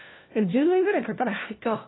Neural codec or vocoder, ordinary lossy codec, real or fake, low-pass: codec, 16 kHz, 1 kbps, FunCodec, trained on LibriTTS, 50 frames a second; AAC, 16 kbps; fake; 7.2 kHz